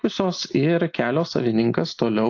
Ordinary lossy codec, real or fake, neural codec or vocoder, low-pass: AAC, 48 kbps; real; none; 7.2 kHz